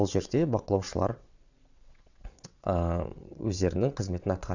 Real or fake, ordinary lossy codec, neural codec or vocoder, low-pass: fake; none; vocoder, 22.05 kHz, 80 mel bands, WaveNeXt; 7.2 kHz